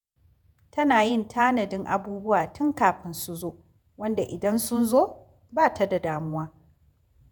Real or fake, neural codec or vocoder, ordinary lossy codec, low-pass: fake; vocoder, 48 kHz, 128 mel bands, Vocos; none; none